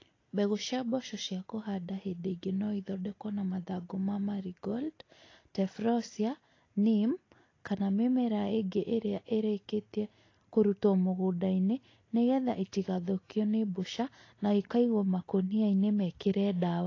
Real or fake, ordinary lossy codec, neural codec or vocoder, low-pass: real; AAC, 32 kbps; none; 7.2 kHz